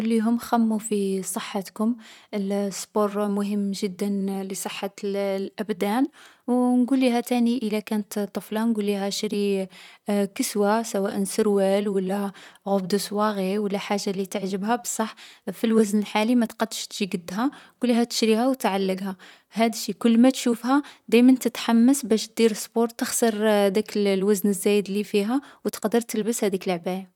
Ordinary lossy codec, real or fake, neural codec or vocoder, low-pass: none; fake; vocoder, 44.1 kHz, 128 mel bands, Pupu-Vocoder; 19.8 kHz